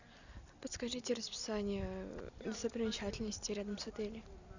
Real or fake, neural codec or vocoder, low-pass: real; none; 7.2 kHz